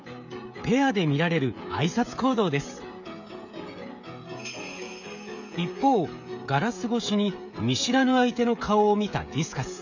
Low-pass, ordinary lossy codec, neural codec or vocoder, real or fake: 7.2 kHz; none; codec, 16 kHz, 16 kbps, FreqCodec, smaller model; fake